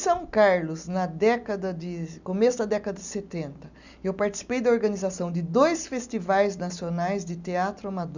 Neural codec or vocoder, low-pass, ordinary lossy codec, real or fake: none; 7.2 kHz; none; real